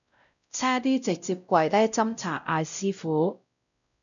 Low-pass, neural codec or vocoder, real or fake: 7.2 kHz; codec, 16 kHz, 0.5 kbps, X-Codec, WavLM features, trained on Multilingual LibriSpeech; fake